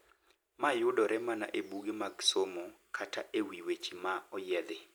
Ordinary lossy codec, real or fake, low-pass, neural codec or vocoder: none; real; none; none